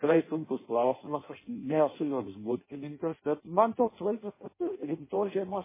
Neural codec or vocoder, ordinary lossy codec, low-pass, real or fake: codec, 16 kHz in and 24 kHz out, 0.6 kbps, FireRedTTS-2 codec; MP3, 16 kbps; 3.6 kHz; fake